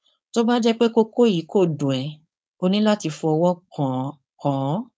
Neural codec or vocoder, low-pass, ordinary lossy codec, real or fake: codec, 16 kHz, 4.8 kbps, FACodec; none; none; fake